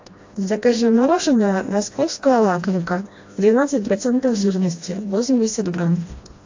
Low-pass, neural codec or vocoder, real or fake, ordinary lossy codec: 7.2 kHz; codec, 16 kHz, 1 kbps, FreqCodec, smaller model; fake; AAC, 48 kbps